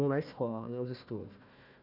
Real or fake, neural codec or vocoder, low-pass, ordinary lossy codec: fake; codec, 16 kHz, 1 kbps, FunCodec, trained on Chinese and English, 50 frames a second; 5.4 kHz; none